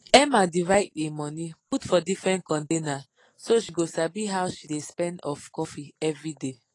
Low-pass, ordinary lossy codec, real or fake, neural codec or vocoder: 10.8 kHz; AAC, 32 kbps; real; none